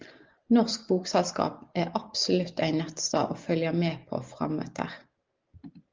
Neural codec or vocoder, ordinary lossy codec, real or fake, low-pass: none; Opus, 24 kbps; real; 7.2 kHz